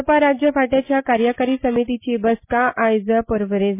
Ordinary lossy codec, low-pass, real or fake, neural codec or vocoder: MP3, 24 kbps; 3.6 kHz; real; none